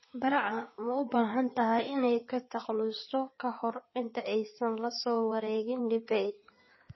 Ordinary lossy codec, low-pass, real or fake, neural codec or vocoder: MP3, 24 kbps; 7.2 kHz; fake; codec, 16 kHz in and 24 kHz out, 2.2 kbps, FireRedTTS-2 codec